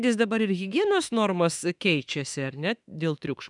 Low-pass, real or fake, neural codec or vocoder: 10.8 kHz; fake; autoencoder, 48 kHz, 32 numbers a frame, DAC-VAE, trained on Japanese speech